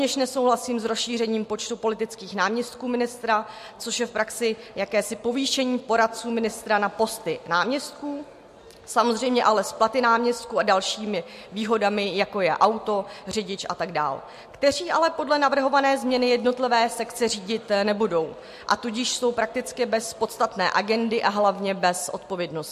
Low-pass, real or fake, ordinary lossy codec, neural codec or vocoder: 14.4 kHz; real; MP3, 64 kbps; none